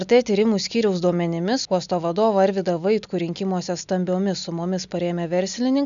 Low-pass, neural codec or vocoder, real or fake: 7.2 kHz; none; real